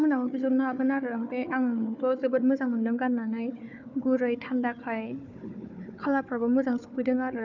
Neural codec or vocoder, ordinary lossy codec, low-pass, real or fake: codec, 16 kHz, 4 kbps, FunCodec, trained on Chinese and English, 50 frames a second; none; 7.2 kHz; fake